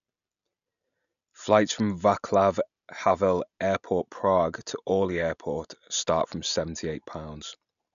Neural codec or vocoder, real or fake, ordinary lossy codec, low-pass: none; real; none; 7.2 kHz